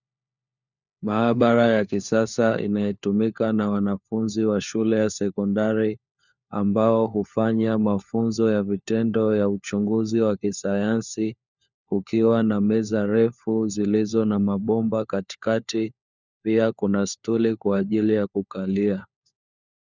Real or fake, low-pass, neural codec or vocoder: fake; 7.2 kHz; codec, 16 kHz, 4 kbps, FunCodec, trained on LibriTTS, 50 frames a second